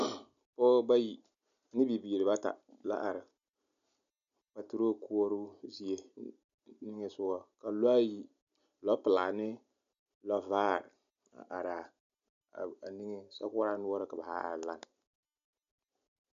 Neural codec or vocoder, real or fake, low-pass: none; real; 7.2 kHz